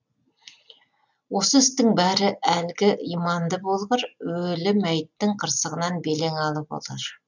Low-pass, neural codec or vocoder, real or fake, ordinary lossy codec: 7.2 kHz; none; real; none